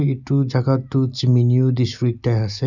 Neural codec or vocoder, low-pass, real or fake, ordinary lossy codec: none; 7.2 kHz; real; none